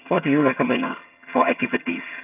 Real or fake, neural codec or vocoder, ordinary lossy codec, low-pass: fake; vocoder, 22.05 kHz, 80 mel bands, HiFi-GAN; none; 3.6 kHz